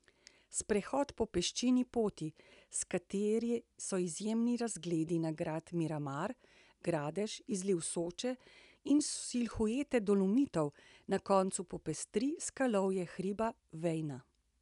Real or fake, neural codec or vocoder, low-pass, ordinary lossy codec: real; none; 10.8 kHz; none